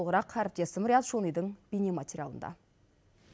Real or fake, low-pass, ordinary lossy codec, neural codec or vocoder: real; none; none; none